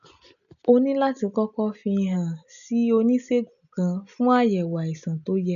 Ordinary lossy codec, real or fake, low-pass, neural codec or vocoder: none; real; 7.2 kHz; none